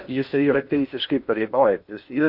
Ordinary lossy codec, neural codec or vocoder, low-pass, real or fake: MP3, 48 kbps; codec, 16 kHz in and 24 kHz out, 0.8 kbps, FocalCodec, streaming, 65536 codes; 5.4 kHz; fake